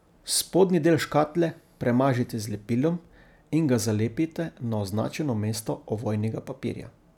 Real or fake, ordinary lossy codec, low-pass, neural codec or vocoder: real; none; 19.8 kHz; none